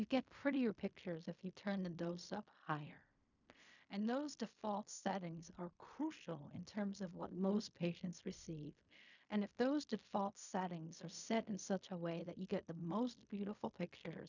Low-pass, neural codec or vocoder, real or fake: 7.2 kHz; codec, 16 kHz in and 24 kHz out, 0.4 kbps, LongCat-Audio-Codec, fine tuned four codebook decoder; fake